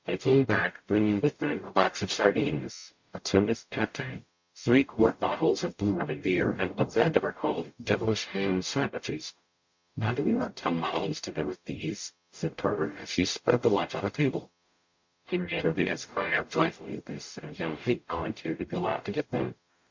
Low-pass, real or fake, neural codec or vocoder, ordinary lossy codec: 7.2 kHz; fake; codec, 44.1 kHz, 0.9 kbps, DAC; MP3, 48 kbps